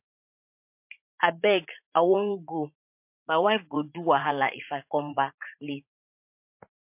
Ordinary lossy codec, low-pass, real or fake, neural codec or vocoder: MP3, 32 kbps; 3.6 kHz; fake; vocoder, 44.1 kHz, 80 mel bands, Vocos